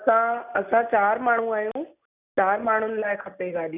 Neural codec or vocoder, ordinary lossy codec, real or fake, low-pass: none; none; real; 3.6 kHz